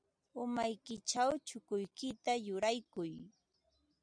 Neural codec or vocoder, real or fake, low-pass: none; real; 9.9 kHz